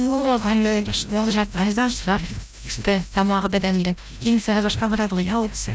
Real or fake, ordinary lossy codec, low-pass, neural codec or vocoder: fake; none; none; codec, 16 kHz, 0.5 kbps, FreqCodec, larger model